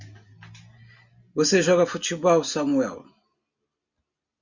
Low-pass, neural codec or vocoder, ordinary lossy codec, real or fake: 7.2 kHz; none; Opus, 64 kbps; real